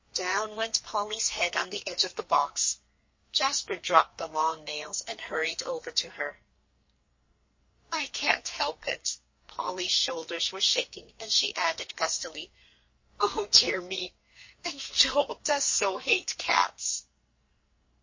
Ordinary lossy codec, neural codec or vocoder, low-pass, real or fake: MP3, 32 kbps; codec, 44.1 kHz, 2.6 kbps, SNAC; 7.2 kHz; fake